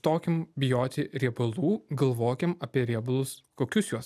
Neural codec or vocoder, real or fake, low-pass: none; real; 14.4 kHz